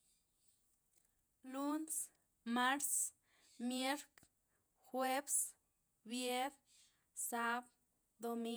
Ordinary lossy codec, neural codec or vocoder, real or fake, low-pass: none; vocoder, 48 kHz, 128 mel bands, Vocos; fake; none